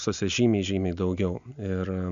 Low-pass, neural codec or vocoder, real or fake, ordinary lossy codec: 7.2 kHz; none; real; Opus, 64 kbps